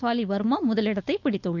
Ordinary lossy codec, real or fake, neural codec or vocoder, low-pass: none; fake; codec, 16 kHz, 4.8 kbps, FACodec; 7.2 kHz